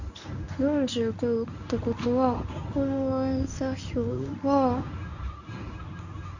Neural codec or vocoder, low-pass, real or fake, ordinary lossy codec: codec, 24 kHz, 0.9 kbps, WavTokenizer, medium speech release version 1; 7.2 kHz; fake; none